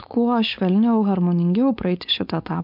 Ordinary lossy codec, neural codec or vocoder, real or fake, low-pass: MP3, 48 kbps; codec, 16 kHz, 4.8 kbps, FACodec; fake; 5.4 kHz